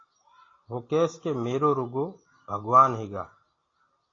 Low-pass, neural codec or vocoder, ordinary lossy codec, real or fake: 7.2 kHz; none; AAC, 32 kbps; real